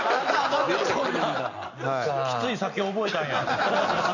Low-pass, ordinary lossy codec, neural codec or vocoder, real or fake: 7.2 kHz; none; none; real